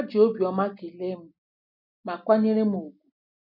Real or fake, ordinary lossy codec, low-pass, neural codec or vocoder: real; none; 5.4 kHz; none